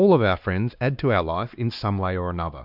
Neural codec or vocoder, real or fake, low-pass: codec, 16 kHz, 2 kbps, X-Codec, WavLM features, trained on Multilingual LibriSpeech; fake; 5.4 kHz